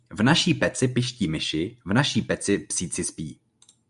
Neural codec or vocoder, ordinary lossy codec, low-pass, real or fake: none; AAC, 96 kbps; 10.8 kHz; real